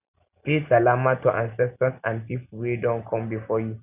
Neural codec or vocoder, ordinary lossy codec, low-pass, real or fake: none; none; 3.6 kHz; real